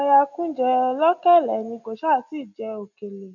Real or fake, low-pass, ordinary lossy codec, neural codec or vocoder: real; 7.2 kHz; none; none